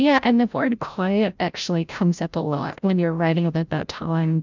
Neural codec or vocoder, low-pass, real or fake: codec, 16 kHz, 0.5 kbps, FreqCodec, larger model; 7.2 kHz; fake